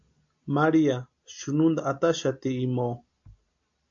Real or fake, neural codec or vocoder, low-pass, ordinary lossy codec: real; none; 7.2 kHz; AAC, 64 kbps